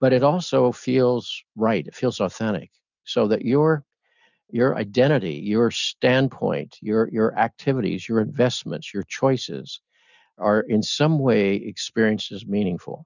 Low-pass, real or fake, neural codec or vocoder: 7.2 kHz; real; none